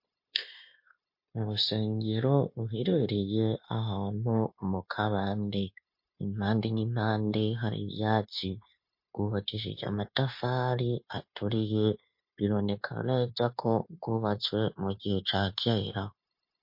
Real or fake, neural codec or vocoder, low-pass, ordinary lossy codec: fake; codec, 16 kHz, 0.9 kbps, LongCat-Audio-Codec; 5.4 kHz; MP3, 32 kbps